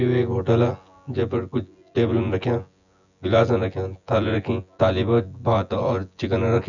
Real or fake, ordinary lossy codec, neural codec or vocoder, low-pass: fake; none; vocoder, 24 kHz, 100 mel bands, Vocos; 7.2 kHz